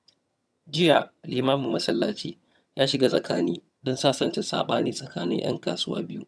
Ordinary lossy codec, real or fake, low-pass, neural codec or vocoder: none; fake; none; vocoder, 22.05 kHz, 80 mel bands, HiFi-GAN